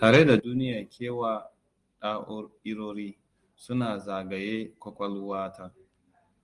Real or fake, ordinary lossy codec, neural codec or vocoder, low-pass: real; Opus, 24 kbps; none; 10.8 kHz